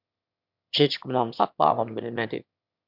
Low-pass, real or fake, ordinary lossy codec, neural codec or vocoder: 5.4 kHz; fake; MP3, 48 kbps; autoencoder, 22.05 kHz, a latent of 192 numbers a frame, VITS, trained on one speaker